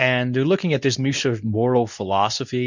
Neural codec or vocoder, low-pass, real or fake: codec, 24 kHz, 0.9 kbps, WavTokenizer, medium speech release version 2; 7.2 kHz; fake